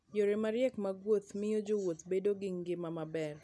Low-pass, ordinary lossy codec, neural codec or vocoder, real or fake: none; none; none; real